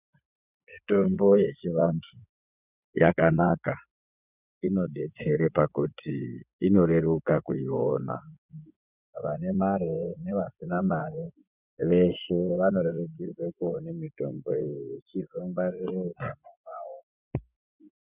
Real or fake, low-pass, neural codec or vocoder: fake; 3.6 kHz; vocoder, 24 kHz, 100 mel bands, Vocos